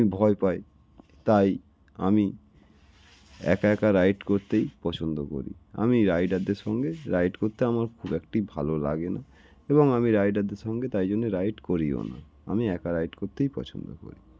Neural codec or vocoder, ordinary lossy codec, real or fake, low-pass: none; none; real; none